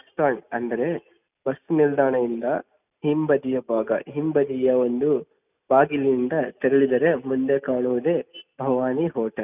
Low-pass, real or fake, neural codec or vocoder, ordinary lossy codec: 3.6 kHz; real; none; none